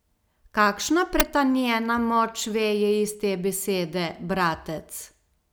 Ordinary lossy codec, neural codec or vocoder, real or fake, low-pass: none; none; real; none